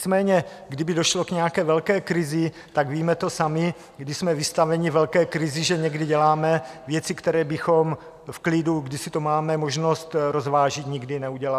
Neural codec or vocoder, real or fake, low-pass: none; real; 14.4 kHz